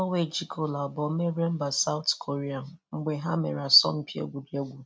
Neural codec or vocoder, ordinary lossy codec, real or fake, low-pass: none; none; real; none